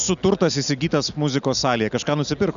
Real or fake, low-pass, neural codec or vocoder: real; 7.2 kHz; none